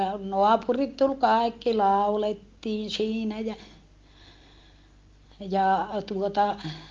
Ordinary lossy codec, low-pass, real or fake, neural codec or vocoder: Opus, 32 kbps; 7.2 kHz; real; none